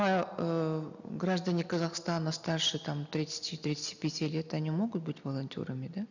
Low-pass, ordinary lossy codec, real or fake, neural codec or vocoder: 7.2 kHz; none; real; none